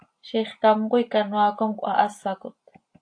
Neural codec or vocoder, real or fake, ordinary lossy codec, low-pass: none; real; AAC, 48 kbps; 9.9 kHz